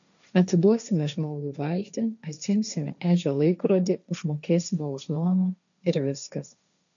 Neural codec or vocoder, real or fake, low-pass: codec, 16 kHz, 1.1 kbps, Voila-Tokenizer; fake; 7.2 kHz